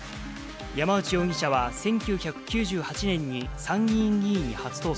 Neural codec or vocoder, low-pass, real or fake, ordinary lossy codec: none; none; real; none